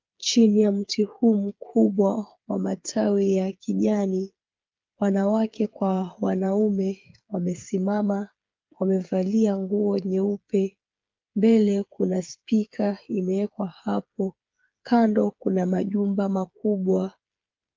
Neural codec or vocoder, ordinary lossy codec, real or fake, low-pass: codec, 16 kHz, 8 kbps, FreqCodec, smaller model; Opus, 24 kbps; fake; 7.2 kHz